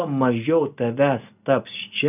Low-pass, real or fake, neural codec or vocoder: 3.6 kHz; real; none